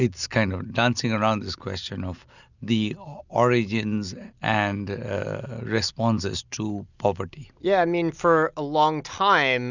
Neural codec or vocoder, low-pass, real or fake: none; 7.2 kHz; real